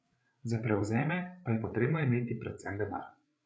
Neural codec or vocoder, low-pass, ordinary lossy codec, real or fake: codec, 16 kHz, 8 kbps, FreqCodec, larger model; none; none; fake